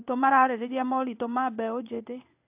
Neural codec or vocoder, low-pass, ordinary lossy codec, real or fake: codec, 16 kHz in and 24 kHz out, 1 kbps, XY-Tokenizer; 3.6 kHz; AAC, 32 kbps; fake